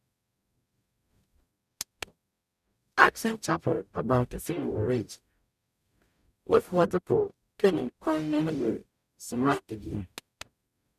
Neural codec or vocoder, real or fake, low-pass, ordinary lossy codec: codec, 44.1 kHz, 0.9 kbps, DAC; fake; 14.4 kHz; none